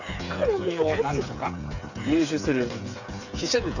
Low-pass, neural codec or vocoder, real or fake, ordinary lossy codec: 7.2 kHz; codec, 16 kHz, 8 kbps, FreqCodec, smaller model; fake; none